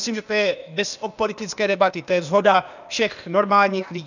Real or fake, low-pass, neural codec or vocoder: fake; 7.2 kHz; codec, 16 kHz, 0.8 kbps, ZipCodec